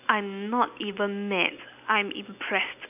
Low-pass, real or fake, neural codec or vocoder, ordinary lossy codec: 3.6 kHz; real; none; none